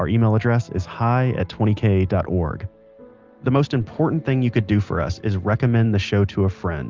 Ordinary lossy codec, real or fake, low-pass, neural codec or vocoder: Opus, 24 kbps; real; 7.2 kHz; none